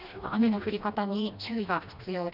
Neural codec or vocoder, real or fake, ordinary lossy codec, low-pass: codec, 16 kHz, 1 kbps, FreqCodec, smaller model; fake; none; 5.4 kHz